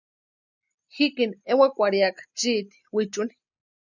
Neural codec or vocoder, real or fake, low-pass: none; real; 7.2 kHz